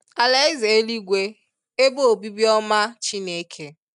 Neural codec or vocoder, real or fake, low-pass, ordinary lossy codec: none; real; 10.8 kHz; none